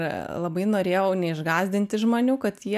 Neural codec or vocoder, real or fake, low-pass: none; real; 14.4 kHz